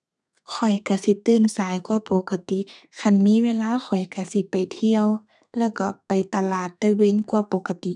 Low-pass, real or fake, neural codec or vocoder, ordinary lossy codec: 10.8 kHz; fake; codec, 32 kHz, 1.9 kbps, SNAC; none